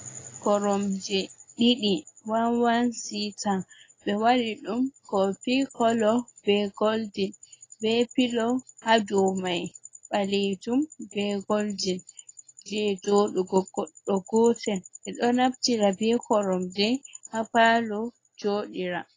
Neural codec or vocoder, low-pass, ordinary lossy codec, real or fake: none; 7.2 kHz; AAC, 32 kbps; real